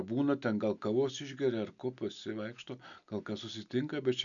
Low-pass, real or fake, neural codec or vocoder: 7.2 kHz; real; none